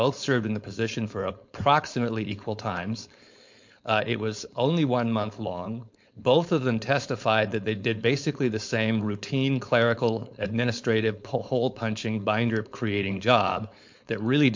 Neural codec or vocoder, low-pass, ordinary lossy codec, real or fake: codec, 16 kHz, 4.8 kbps, FACodec; 7.2 kHz; MP3, 48 kbps; fake